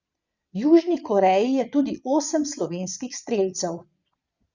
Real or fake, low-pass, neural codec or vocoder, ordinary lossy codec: fake; 7.2 kHz; vocoder, 22.05 kHz, 80 mel bands, Vocos; Opus, 64 kbps